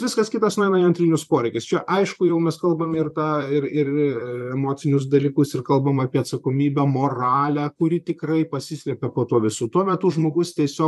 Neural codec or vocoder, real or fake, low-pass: vocoder, 44.1 kHz, 128 mel bands, Pupu-Vocoder; fake; 14.4 kHz